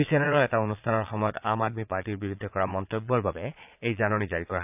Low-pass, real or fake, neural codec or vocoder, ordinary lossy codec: 3.6 kHz; fake; vocoder, 22.05 kHz, 80 mel bands, Vocos; AAC, 32 kbps